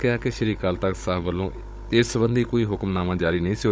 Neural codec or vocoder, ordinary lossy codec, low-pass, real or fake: codec, 16 kHz, 16 kbps, FunCodec, trained on Chinese and English, 50 frames a second; none; none; fake